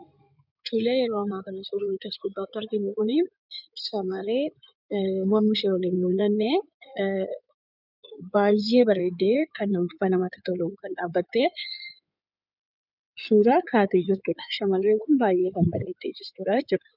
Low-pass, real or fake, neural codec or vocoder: 5.4 kHz; fake; codec, 16 kHz in and 24 kHz out, 2.2 kbps, FireRedTTS-2 codec